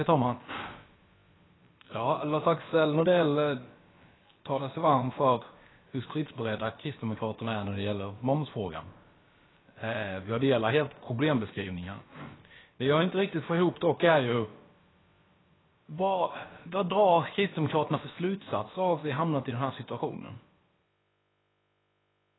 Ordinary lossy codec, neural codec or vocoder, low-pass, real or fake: AAC, 16 kbps; codec, 16 kHz, about 1 kbps, DyCAST, with the encoder's durations; 7.2 kHz; fake